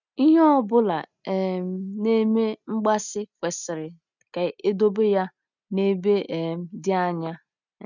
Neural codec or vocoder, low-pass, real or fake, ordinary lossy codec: none; 7.2 kHz; real; none